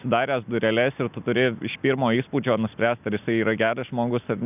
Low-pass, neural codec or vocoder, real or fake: 3.6 kHz; none; real